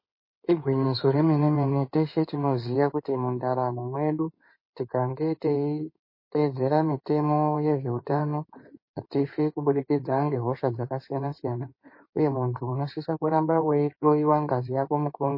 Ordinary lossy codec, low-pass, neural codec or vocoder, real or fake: MP3, 24 kbps; 5.4 kHz; codec, 16 kHz in and 24 kHz out, 2.2 kbps, FireRedTTS-2 codec; fake